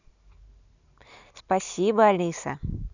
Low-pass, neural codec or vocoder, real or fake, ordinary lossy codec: 7.2 kHz; none; real; none